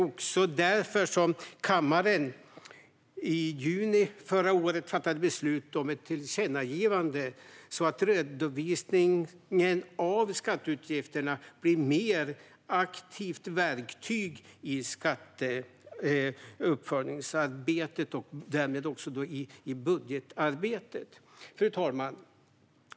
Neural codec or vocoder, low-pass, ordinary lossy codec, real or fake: none; none; none; real